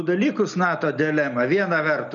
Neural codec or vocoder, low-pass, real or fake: none; 7.2 kHz; real